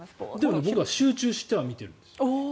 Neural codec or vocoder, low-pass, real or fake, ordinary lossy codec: none; none; real; none